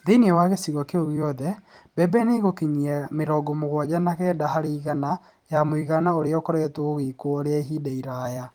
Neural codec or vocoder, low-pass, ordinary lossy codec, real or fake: vocoder, 44.1 kHz, 128 mel bands every 256 samples, BigVGAN v2; 19.8 kHz; Opus, 24 kbps; fake